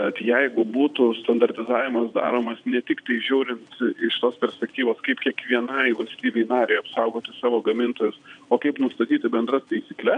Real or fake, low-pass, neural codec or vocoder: fake; 9.9 kHz; vocoder, 22.05 kHz, 80 mel bands, WaveNeXt